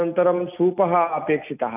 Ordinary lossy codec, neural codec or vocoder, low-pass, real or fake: none; none; 3.6 kHz; real